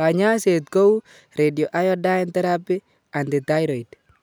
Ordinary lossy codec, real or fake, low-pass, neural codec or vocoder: none; real; none; none